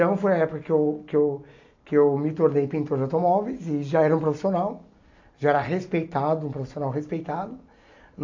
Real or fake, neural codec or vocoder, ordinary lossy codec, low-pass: real; none; AAC, 48 kbps; 7.2 kHz